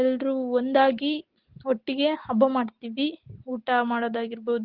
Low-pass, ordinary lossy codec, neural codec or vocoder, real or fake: 5.4 kHz; Opus, 16 kbps; none; real